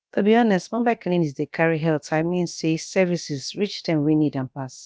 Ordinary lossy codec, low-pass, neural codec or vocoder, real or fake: none; none; codec, 16 kHz, about 1 kbps, DyCAST, with the encoder's durations; fake